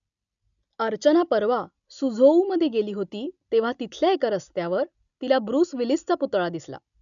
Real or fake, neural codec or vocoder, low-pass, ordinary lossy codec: real; none; 7.2 kHz; none